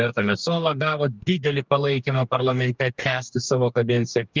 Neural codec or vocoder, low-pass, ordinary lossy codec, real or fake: codec, 44.1 kHz, 2.6 kbps, DAC; 7.2 kHz; Opus, 24 kbps; fake